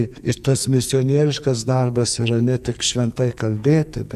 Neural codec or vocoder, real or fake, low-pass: codec, 44.1 kHz, 2.6 kbps, SNAC; fake; 14.4 kHz